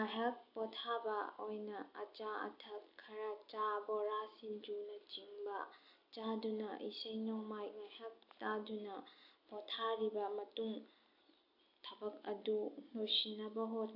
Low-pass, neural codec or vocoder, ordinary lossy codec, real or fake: 5.4 kHz; none; AAC, 32 kbps; real